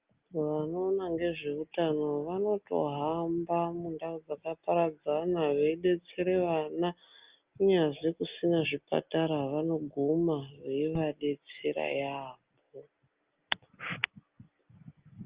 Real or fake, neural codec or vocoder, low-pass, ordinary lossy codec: real; none; 3.6 kHz; Opus, 24 kbps